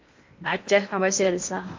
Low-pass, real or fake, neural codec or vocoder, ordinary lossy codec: 7.2 kHz; fake; codec, 16 kHz in and 24 kHz out, 0.8 kbps, FocalCodec, streaming, 65536 codes; MP3, 64 kbps